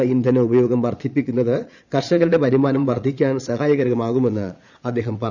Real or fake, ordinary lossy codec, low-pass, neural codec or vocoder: fake; none; 7.2 kHz; vocoder, 22.05 kHz, 80 mel bands, Vocos